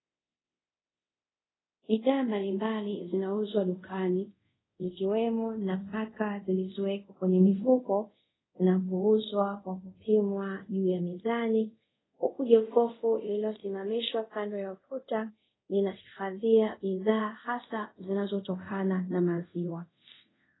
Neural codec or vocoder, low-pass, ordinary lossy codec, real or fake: codec, 24 kHz, 0.5 kbps, DualCodec; 7.2 kHz; AAC, 16 kbps; fake